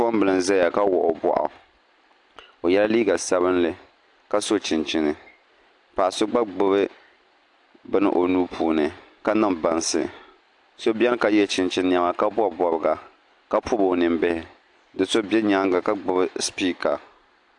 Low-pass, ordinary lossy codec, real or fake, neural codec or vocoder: 10.8 kHz; AAC, 64 kbps; real; none